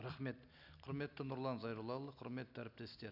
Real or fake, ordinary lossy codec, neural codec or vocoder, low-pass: real; none; none; 5.4 kHz